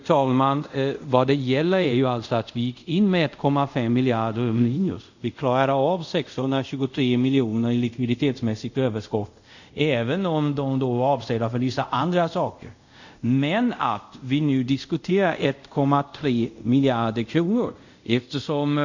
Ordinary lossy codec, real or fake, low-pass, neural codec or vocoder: none; fake; 7.2 kHz; codec, 24 kHz, 0.5 kbps, DualCodec